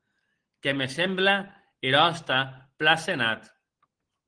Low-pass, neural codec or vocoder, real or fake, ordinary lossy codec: 9.9 kHz; none; real; Opus, 16 kbps